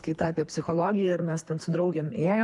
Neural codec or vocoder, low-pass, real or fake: codec, 24 kHz, 3 kbps, HILCodec; 10.8 kHz; fake